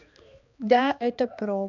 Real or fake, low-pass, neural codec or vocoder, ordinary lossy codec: fake; 7.2 kHz; codec, 16 kHz, 2 kbps, X-Codec, HuBERT features, trained on balanced general audio; none